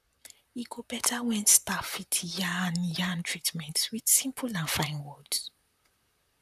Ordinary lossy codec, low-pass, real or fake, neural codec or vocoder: none; 14.4 kHz; fake; vocoder, 44.1 kHz, 128 mel bands, Pupu-Vocoder